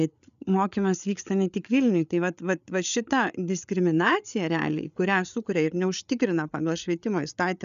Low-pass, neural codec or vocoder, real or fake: 7.2 kHz; codec, 16 kHz, 8 kbps, FreqCodec, larger model; fake